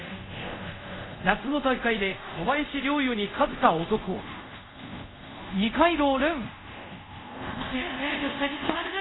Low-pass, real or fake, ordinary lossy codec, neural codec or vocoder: 7.2 kHz; fake; AAC, 16 kbps; codec, 24 kHz, 0.5 kbps, DualCodec